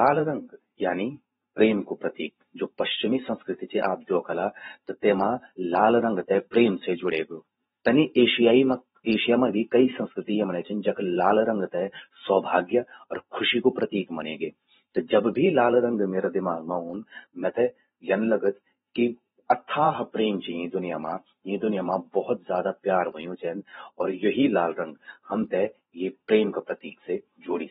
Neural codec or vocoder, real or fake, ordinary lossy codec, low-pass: vocoder, 44.1 kHz, 128 mel bands every 512 samples, BigVGAN v2; fake; AAC, 16 kbps; 19.8 kHz